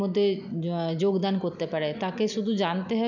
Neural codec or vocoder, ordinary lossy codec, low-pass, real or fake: none; none; 7.2 kHz; real